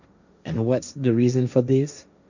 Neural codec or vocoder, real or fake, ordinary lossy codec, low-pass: codec, 16 kHz, 1.1 kbps, Voila-Tokenizer; fake; none; 7.2 kHz